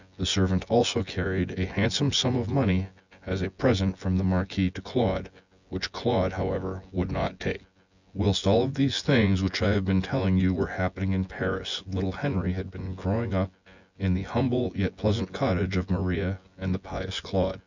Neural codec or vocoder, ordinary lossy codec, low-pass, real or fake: vocoder, 24 kHz, 100 mel bands, Vocos; Opus, 64 kbps; 7.2 kHz; fake